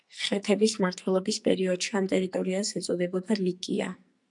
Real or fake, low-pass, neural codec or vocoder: fake; 10.8 kHz; codec, 44.1 kHz, 2.6 kbps, SNAC